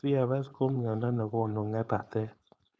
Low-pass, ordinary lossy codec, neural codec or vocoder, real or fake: none; none; codec, 16 kHz, 4.8 kbps, FACodec; fake